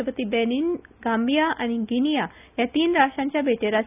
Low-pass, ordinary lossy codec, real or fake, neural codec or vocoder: 3.6 kHz; none; real; none